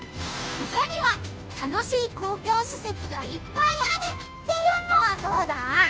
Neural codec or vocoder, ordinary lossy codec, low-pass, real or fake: codec, 16 kHz, 0.5 kbps, FunCodec, trained on Chinese and English, 25 frames a second; none; none; fake